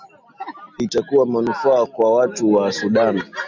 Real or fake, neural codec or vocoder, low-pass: real; none; 7.2 kHz